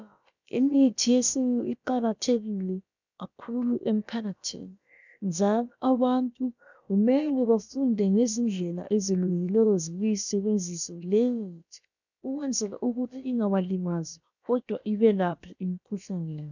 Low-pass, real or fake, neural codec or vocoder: 7.2 kHz; fake; codec, 16 kHz, about 1 kbps, DyCAST, with the encoder's durations